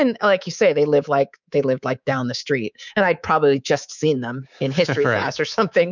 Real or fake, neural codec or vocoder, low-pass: fake; codec, 24 kHz, 3.1 kbps, DualCodec; 7.2 kHz